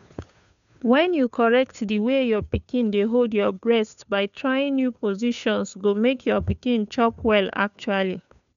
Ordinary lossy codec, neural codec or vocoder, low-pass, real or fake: none; codec, 16 kHz, 2 kbps, FunCodec, trained on Chinese and English, 25 frames a second; 7.2 kHz; fake